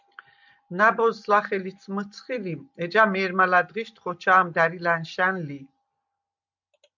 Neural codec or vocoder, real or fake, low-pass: none; real; 7.2 kHz